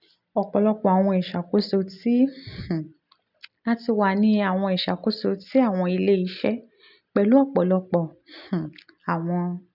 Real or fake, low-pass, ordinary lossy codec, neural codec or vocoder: real; 5.4 kHz; none; none